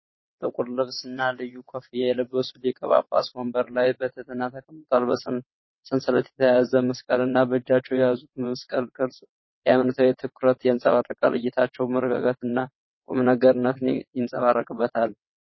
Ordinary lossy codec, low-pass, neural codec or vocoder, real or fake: MP3, 24 kbps; 7.2 kHz; vocoder, 22.05 kHz, 80 mel bands, WaveNeXt; fake